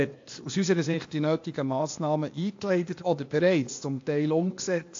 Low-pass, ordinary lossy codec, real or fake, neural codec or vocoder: 7.2 kHz; AAC, 48 kbps; fake; codec, 16 kHz, 0.8 kbps, ZipCodec